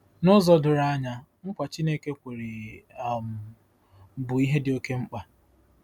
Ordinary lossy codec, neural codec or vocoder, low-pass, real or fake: none; none; 19.8 kHz; real